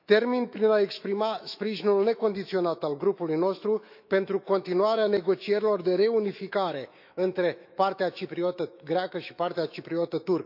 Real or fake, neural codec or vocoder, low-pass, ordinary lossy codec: fake; autoencoder, 48 kHz, 128 numbers a frame, DAC-VAE, trained on Japanese speech; 5.4 kHz; none